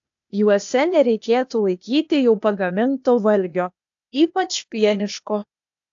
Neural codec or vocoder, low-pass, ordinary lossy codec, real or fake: codec, 16 kHz, 0.8 kbps, ZipCodec; 7.2 kHz; AAC, 64 kbps; fake